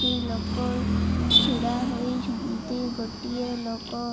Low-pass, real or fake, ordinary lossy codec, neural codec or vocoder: none; real; none; none